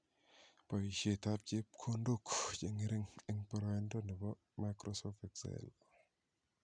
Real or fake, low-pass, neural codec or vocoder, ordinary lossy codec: real; 9.9 kHz; none; MP3, 96 kbps